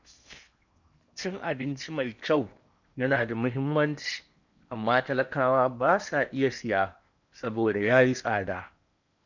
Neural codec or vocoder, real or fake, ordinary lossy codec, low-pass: codec, 16 kHz in and 24 kHz out, 0.8 kbps, FocalCodec, streaming, 65536 codes; fake; none; 7.2 kHz